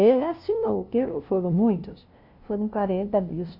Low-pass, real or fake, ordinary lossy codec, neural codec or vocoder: 5.4 kHz; fake; Opus, 64 kbps; codec, 16 kHz, 0.5 kbps, FunCodec, trained on LibriTTS, 25 frames a second